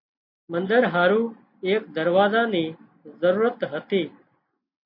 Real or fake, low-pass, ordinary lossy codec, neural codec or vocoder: real; 5.4 kHz; MP3, 32 kbps; none